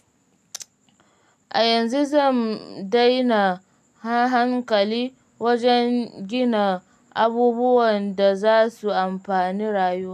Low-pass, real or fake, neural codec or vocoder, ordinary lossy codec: 14.4 kHz; real; none; none